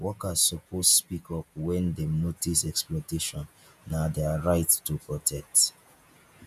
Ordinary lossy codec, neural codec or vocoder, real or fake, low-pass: none; vocoder, 48 kHz, 128 mel bands, Vocos; fake; 14.4 kHz